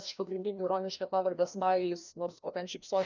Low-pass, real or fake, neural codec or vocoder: 7.2 kHz; fake; codec, 16 kHz, 1 kbps, FreqCodec, larger model